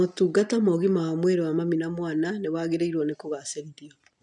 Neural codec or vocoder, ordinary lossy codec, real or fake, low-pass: none; none; real; 10.8 kHz